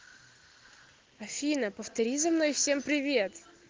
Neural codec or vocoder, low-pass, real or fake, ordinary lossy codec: none; 7.2 kHz; real; Opus, 16 kbps